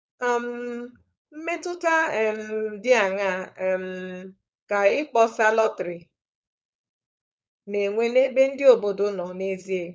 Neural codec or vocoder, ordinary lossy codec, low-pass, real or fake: codec, 16 kHz, 4.8 kbps, FACodec; none; none; fake